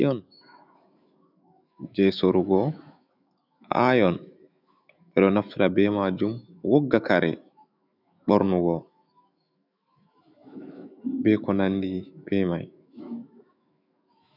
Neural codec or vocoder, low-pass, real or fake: autoencoder, 48 kHz, 128 numbers a frame, DAC-VAE, trained on Japanese speech; 5.4 kHz; fake